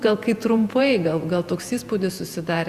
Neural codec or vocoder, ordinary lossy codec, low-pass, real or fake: vocoder, 48 kHz, 128 mel bands, Vocos; Opus, 64 kbps; 14.4 kHz; fake